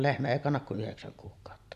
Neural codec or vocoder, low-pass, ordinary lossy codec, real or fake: none; 14.4 kHz; none; real